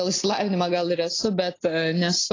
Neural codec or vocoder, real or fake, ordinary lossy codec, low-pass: none; real; AAC, 32 kbps; 7.2 kHz